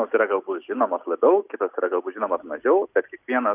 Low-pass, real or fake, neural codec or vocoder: 3.6 kHz; real; none